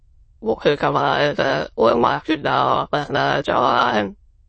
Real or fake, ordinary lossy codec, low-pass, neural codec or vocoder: fake; MP3, 32 kbps; 9.9 kHz; autoencoder, 22.05 kHz, a latent of 192 numbers a frame, VITS, trained on many speakers